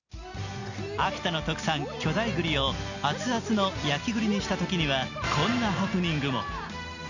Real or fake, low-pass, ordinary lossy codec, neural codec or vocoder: real; 7.2 kHz; none; none